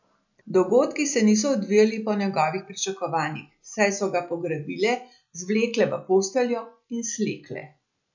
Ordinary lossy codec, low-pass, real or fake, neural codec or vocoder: none; 7.2 kHz; real; none